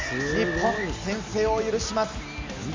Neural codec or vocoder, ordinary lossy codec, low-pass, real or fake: none; MP3, 64 kbps; 7.2 kHz; real